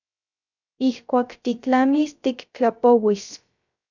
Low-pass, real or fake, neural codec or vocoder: 7.2 kHz; fake; codec, 16 kHz, 0.3 kbps, FocalCodec